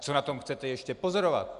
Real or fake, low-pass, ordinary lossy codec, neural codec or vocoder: real; 10.8 kHz; Opus, 64 kbps; none